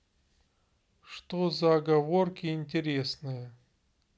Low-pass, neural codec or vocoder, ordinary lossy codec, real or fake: none; none; none; real